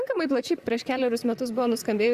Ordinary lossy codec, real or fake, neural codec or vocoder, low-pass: Opus, 64 kbps; fake; vocoder, 44.1 kHz, 128 mel bands, Pupu-Vocoder; 14.4 kHz